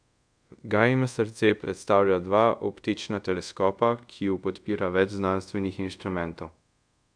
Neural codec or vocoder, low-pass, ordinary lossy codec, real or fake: codec, 24 kHz, 0.5 kbps, DualCodec; 9.9 kHz; none; fake